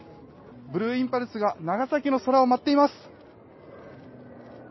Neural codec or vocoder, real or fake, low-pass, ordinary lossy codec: none; real; 7.2 kHz; MP3, 24 kbps